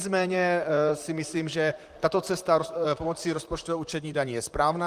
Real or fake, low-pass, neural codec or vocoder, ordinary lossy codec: fake; 14.4 kHz; vocoder, 44.1 kHz, 128 mel bands, Pupu-Vocoder; Opus, 32 kbps